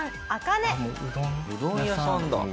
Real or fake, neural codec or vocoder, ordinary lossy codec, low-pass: real; none; none; none